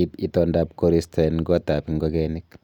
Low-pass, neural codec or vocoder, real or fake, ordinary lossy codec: 19.8 kHz; none; real; none